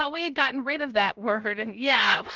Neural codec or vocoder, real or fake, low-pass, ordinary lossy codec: codec, 16 kHz, 0.7 kbps, FocalCodec; fake; 7.2 kHz; Opus, 32 kbps